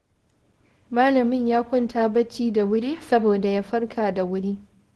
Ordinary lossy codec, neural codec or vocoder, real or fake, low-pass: Opus, 16 kbps; codec, 24 kHz, 0.9 kbps, WavTokenizer, small release; fake; 10.8 kHz